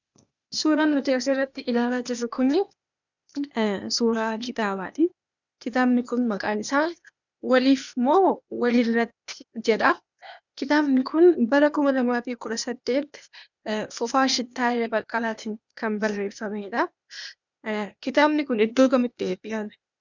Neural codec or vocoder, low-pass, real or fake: codec, 16 kHz, 0.8 kbps, ZipCodec; 7.2 kHz; fake